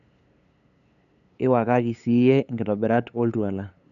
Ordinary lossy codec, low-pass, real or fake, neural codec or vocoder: AAC, 96 kbps; 7.2 kHz; fake; codec, 16 kHz, 8 kbps, FunCodec, trained on LibriTTS, 25 frames a second